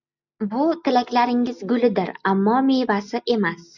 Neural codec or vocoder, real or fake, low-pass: none; real; 7.2 kHz